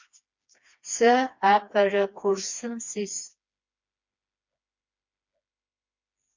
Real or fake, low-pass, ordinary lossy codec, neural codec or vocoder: fake; 7.2 kHz; MP3, 48 kbps; codec, 16 kHz, 2 kbps, FreqCodec, smaller model